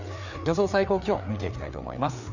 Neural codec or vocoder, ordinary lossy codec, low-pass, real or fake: codec, 16 kHz, 4 kbps, FreqCodec, larger model; none; 7.2 kHz; fake